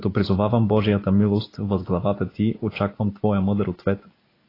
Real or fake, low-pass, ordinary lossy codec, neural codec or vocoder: real; 5.4 kHz; AAC, 24 kbps; none